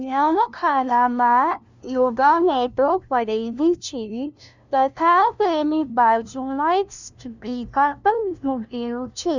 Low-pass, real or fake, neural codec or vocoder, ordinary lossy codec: 7.2 kHz; fake; codec, 16 kHz, 0.5 kbps, FunCodec, trained on LibriTTS, 25 frames a second; none